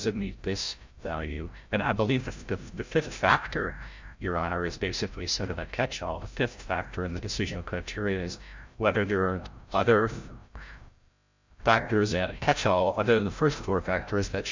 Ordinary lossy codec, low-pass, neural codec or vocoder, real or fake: MP3, 64 kbps; 7.2 kHz; codec, 16 kHz, 0.5 kbps, FreqCodec, larger model; fake